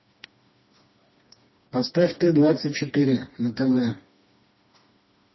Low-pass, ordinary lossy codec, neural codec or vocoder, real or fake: 7.2 kHz; MP3, 24 kbps; codec, 16 kHz, 2 kbps, FreqCodec, smaller model; fake